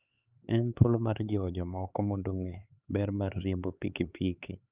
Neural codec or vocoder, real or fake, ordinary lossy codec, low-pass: codec, 16 kHz, 4 kbps, X-Codec, HuBERT features, trained on LibriSpeech; fake; Opus, 24 kbps; 3.6 kHz